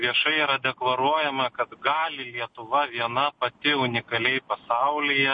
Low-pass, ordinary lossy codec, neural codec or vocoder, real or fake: 9.9 kHz; MP3, 48 kbps; none; real